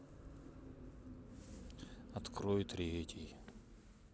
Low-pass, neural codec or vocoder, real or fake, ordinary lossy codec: none; none; real; none